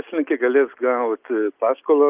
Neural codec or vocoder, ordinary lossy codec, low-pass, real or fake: none; Opus, 24 kbps; 3.6 kHz; real